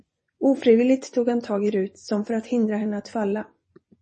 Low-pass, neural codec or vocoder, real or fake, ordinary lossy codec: 10.8 kHz; vocoder, 24 kHz, 100 mel bands, Vocos; fake; MP3, 32 kbps